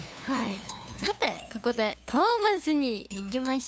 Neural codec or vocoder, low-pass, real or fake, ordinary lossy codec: codec, 16 kHz, 2 kbps, FunCodec, trained on LibriTTS, 25 frames a second; none; fake; none